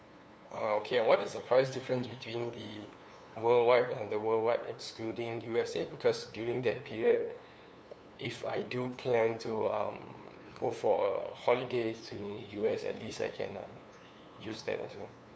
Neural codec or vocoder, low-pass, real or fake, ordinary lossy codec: codec, 16 kHz, 2 kbps, FunCodec, trained on LibriTTS, 25 frames a second; none; fake; none